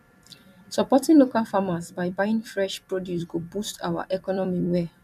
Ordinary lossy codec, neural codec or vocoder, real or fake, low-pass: MP3, 96 kbps; vocoder, 44.1 kHz, 128 mel bands every 256 samples, BigVGAN v2; fake; 14.4 kHz